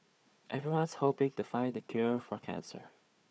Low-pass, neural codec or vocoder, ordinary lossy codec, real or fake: none; codec, 16 kHz, 4 kbps, FunCodec, trained on Chinese and English, 50 frames a second; none; fake